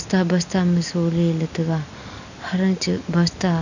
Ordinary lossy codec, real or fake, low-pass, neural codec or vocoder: none; real; 7.2 kHz; none